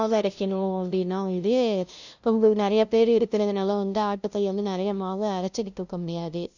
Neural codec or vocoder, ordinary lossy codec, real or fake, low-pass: codec, 16 kHz, 0.5 kbps, FunCodec, trained on LibriTTS, 25 frames a second; none; fake; 7.2 kHz